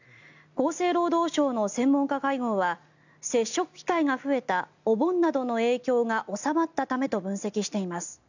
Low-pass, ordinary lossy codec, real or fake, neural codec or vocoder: 7.2 kHz; none; real; none